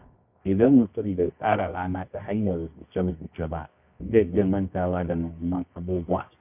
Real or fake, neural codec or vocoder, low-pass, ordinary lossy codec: fake; codec, 24 kHz, 0.9 kbps, WavTokenizer, medium music audio release; 3.6 kHz; AAC, 32 kbps